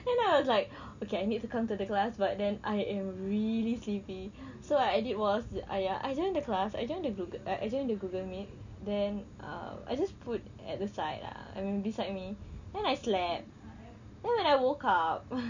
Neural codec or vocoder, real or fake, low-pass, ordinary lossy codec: none; real; 7.2 kHz; none